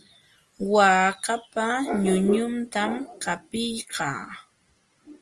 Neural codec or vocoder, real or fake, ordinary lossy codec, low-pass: none; real; Opus, 24 kbps; 10.8 kHz